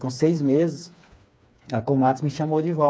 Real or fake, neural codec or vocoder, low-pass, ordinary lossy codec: fake; codec, 16 kHz, 4 kbps, FreqCodec, smaller model; none; none